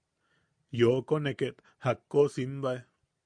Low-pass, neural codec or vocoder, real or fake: 9.9 kHz; none; real